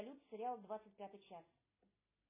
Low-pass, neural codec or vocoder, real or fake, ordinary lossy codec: 3.6 kHz; none; real; MP3, 16 kbps